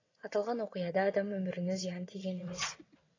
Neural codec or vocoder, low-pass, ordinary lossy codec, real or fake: vocoder, 22.05 kHz, 80 mel bands, WaveNeXt; 7.2 kHz; AAC, 32 kbps; fake